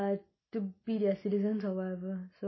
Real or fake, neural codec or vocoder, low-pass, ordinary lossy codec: real; none; 7.2 kHz; MP3, 24 kbps